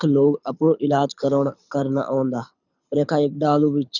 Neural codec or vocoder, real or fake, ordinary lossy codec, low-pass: codec, 24 kHz, 6 kbps, HILCodec; fake; none; 7.2 kHz